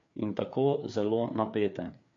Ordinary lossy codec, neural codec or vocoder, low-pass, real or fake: MP3, 48 kbps; codec, 16 kHz, 4 kbps, FreqCodec, larger model; 7.2 kHz; fake